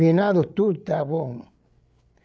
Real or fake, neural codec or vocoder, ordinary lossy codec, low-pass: fake; codec, 16 kHz, 8 kbps, FreqCodec, larger model; none; none